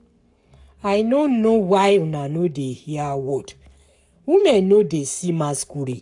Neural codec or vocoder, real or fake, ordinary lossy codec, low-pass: codec, 44.1 kHz, 7.8 kbps, Pupu-Codec; fake; none; 10.8 kHz